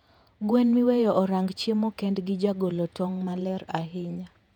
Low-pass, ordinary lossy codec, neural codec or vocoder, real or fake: 19.8 kHz; none; vocoder, 48 kHz, 128 mel bands, Vocos; fake